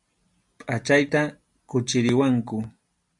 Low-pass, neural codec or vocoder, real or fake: 10.8 kHz; none; real